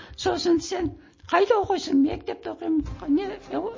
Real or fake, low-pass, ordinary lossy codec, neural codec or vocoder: real; 7.2 kHz; MP3, 32 kbps; none